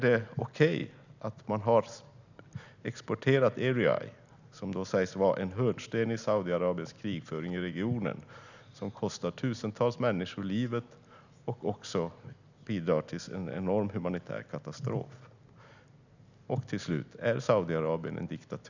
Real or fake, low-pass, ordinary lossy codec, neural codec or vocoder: real; 7.2 kHz; none; none